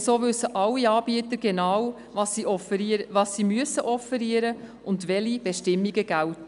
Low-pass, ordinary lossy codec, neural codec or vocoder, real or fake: 10.8 kHz; none; none; real